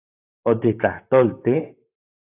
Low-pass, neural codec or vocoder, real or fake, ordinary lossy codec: 3.6 kHz; vocoder, 44.1 kHz, 128 mel bands, Pupu-Vocoder; fake; AAC, 24 kbps